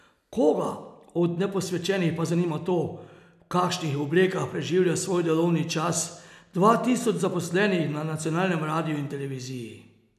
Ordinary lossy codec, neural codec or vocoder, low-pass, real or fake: none; none; 14.4 kHz; real